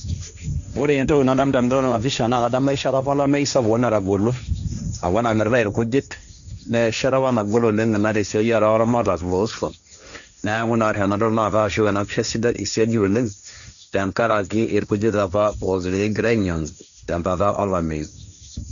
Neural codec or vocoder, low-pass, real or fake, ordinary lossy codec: codec, 16 kHz, 1.1 kbps, Voila-Tokenizer; 7.2 kHz; fake; none